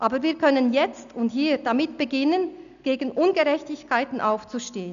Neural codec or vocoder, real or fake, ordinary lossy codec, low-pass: none; real; none; 7.2 kHz